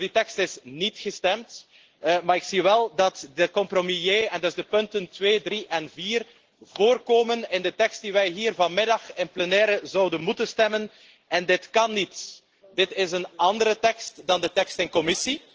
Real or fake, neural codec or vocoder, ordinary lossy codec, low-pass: real; none; Opus, 16 kbps; 7.2 kHz